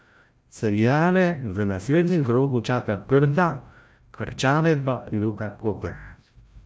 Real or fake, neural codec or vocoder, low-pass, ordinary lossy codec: fake; codec, 16 kHz, 0.5 kbps, FreqCodec, larger model; none; none